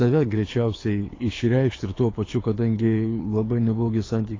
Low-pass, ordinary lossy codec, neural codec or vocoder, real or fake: 7.2 kHz; AAC, 32 kbps; codec, 24 kHz, 6 kbps, HILCodec; fake